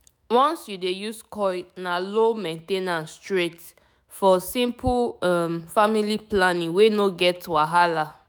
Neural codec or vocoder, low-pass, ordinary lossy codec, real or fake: autoencoder, 48 kHz, 128 numbers a frame, DAC-VAE, trained on Japanese speech; none; none; fake